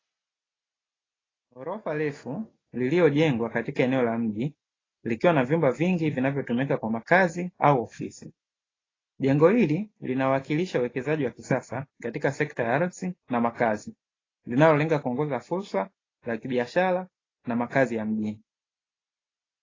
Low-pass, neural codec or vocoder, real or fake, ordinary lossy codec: 7.2 kHz; none; real; AAC, 32 kbps